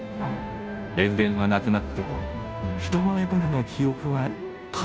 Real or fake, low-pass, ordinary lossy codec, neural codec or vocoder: fake; none; none; codec, 16 kHz, 0.5 kbps, FunCodec, trained on Chinese and English, 25 frames a second